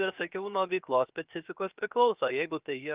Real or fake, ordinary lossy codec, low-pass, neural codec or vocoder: fake; Opus, 32 kbps; 3.6 kHz; codec, 16 kHz, 0.7 kbps, FocalCodec